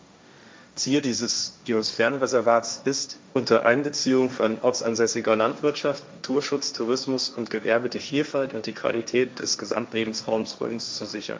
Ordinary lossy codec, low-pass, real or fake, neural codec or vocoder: none; none; fake; codec, 16 kHz, 1.1 kbps, Voila-Tokenizer